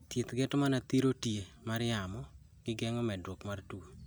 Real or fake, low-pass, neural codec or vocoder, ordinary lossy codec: real; none; none; none